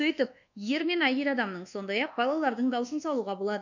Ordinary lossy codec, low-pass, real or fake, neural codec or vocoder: none; 7.2 kHz; fake; codec, 24 kHz, 1.2 kbps, DualCodec